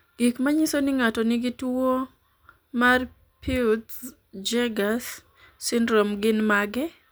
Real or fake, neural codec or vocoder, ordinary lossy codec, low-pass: real; none; none; none